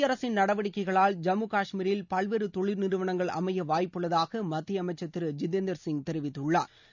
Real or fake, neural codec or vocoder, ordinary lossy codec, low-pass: real; none; none; 7.2 kHz